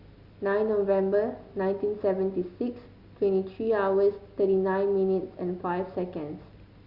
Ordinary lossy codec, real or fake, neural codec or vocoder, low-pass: Opus, 64 kbps; real; none; 5.4 kHz